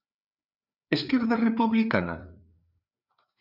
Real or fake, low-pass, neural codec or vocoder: fake; 5.4 kHz; codec, 16 kHz, 4 kbps, FreqCodec, larger model